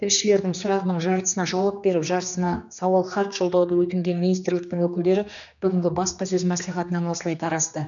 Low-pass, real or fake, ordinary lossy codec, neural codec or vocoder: 7.2 kHz; fake; none; codec, 16 kHz, 2 kbps, X-Codec, HuBERT features, trained on general audio